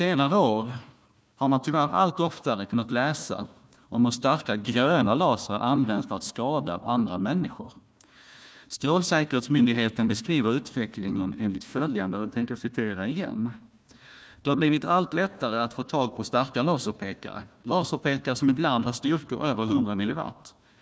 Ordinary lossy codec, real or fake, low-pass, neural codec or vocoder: none; fake; none; codec, 16 kHz, 1 kbps, FunCodec, trained on Chinese and English, 50 frames a second